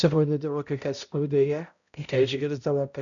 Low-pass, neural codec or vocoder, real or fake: 7.2 kHz; codec, 16 kHz, 0.5 kbps, X-Codec, HuBERT features, trained on balanced general audio; fake